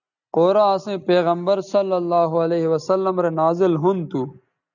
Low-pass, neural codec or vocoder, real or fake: 7.2 kHz; none; real